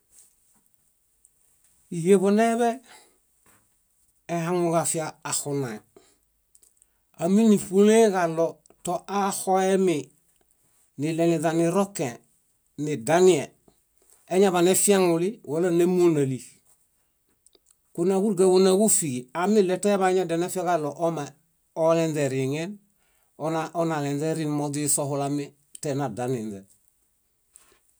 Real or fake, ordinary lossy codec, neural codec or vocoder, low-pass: real; none; none; none